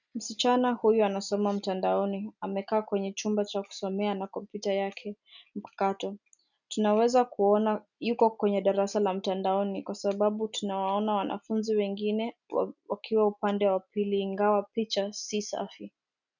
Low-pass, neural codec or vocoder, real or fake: 7.2 kHz; none; real